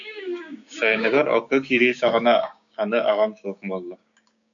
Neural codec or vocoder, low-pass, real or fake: codec, 16 kHz, 6 kbps, DAC; 7.2 kHz; fake